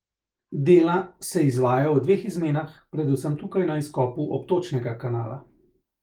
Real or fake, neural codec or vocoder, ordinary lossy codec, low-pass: fake; autoencoder, 48 kHz, 128 numbers a frame, DAC-VAE, trained on Japanese speech; Opus, 24 kbps; 19.8 kHz